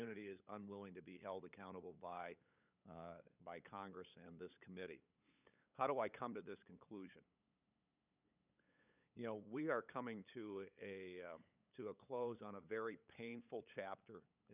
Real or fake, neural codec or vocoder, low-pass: fake; codec, 16 kHz, 8 kbps, FunCodec, trained on LibriTTS, 25 frames a second; 3.6 kHz